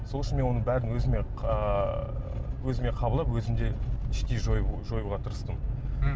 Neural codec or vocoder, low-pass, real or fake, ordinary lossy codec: none; none; real; none